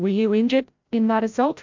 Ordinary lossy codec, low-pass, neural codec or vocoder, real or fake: MP3, 64 kbps; 7.2 kHz; codec, 16 kHz, 0.5 kbps, FreqCodec, larger model; fake